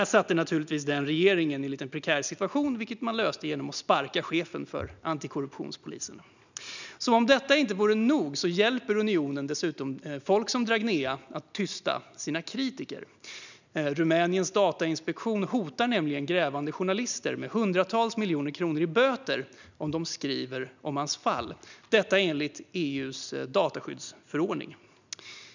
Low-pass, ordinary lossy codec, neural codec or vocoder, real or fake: 7.2 kHz; none; none; real